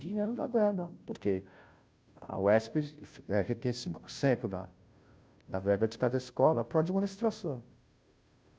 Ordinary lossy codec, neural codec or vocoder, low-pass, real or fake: none; codec, 16 kHz, 0.5 kbps, FunCodec, trained on Chinese and English, 25 frames a second; none; fake